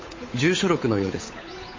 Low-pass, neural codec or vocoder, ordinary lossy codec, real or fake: 7.2 kHz; codec, 16 kHz, 8 kbps, FunCodec, trained on Chinese and English, 25 frames a second; MP3, 32 kbps; fake